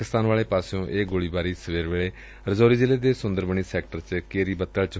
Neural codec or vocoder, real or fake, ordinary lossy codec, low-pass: none; real; none; none